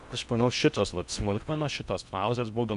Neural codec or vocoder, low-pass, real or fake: codec, 16 kHz in and 24 kHz out, 0.6 kbps, FocalCodec, streaming, 4096 codes; 10.8 kHz; fake